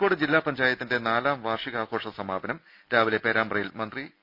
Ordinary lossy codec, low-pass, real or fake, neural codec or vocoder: none; 5.4 kHz; real; none